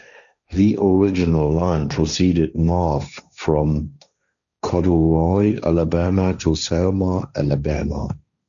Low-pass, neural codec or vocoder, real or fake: 7.2 kHz; codec, 16 kHz, 1.1 kbps, Voila-Tokenizer; fake